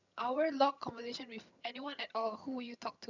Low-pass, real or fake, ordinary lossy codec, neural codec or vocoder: 7.2 kHz; fake; none; vocoder, 22.05 kHz, 80 mel bands, HiFi-GAN